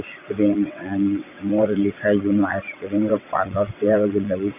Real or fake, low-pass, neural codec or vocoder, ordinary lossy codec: fake; 3.6 kHz; vocoder, 22.05 kHz, 80 mel bands, WaveNeXt; none